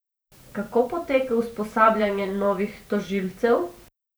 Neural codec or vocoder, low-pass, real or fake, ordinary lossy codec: vocoder, 44.1 kHz, 128 mel bands every 512 samples, BigVGAN v2; none; fake; none